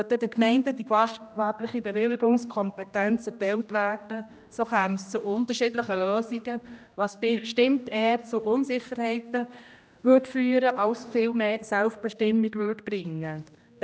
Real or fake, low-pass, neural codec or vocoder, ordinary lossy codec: fake; none; codec, 16 kHz, 1 kbps, X-Codec, HuBERT features, trained on general audio; none